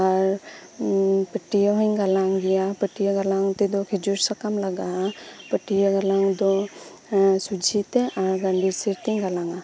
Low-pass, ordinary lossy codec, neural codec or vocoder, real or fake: none; none; none; real